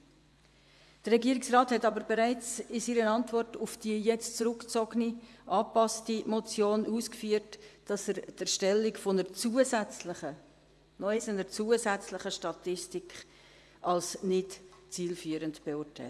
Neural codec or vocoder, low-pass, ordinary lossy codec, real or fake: vocoder, 24 kHz, 100 mel bands, Vocos; none; none; fake